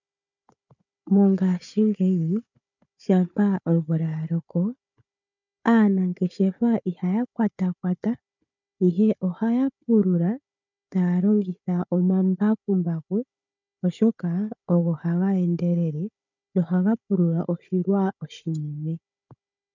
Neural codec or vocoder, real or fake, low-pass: codec, 16 kHz, 4 kbps, FunCodec, trained on Chinese and English, 50 frames a second; fake; 7.2 kHz